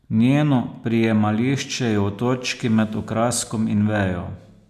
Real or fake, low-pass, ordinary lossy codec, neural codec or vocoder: real; 14.4 kHz; none; none